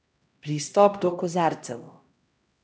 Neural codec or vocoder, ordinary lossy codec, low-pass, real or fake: codec, 16 kHz, 1 kbps, X-Codec, HuBERT features, trained on LibriSpeech; none; none; fake